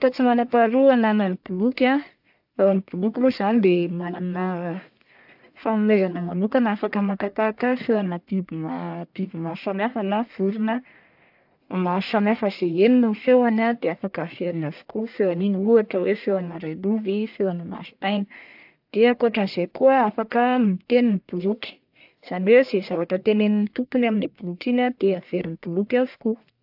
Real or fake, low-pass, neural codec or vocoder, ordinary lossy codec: fake; 5.4 kHz; codec, 44.1 kHz, 1.7 kbps, Pupu-Codec; AAC, 48 kbps